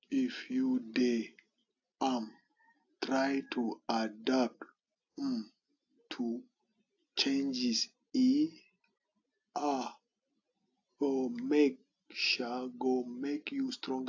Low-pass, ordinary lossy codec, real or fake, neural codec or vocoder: 7.2 kHz; AAC, 48 kbps; real; none